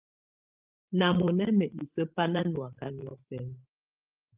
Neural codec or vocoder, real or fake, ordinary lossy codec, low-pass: codec, 16 kHz, 16 kbps, FreqCodec, larger model; fake; Opus, 32 kbps; 3.6 kHz